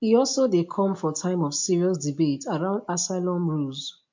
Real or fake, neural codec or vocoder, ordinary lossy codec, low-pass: real; none; MP3, 48 kbps; 7.2 kHz